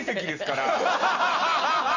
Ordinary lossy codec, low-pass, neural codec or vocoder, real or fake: none; 7.2 kHz; none; real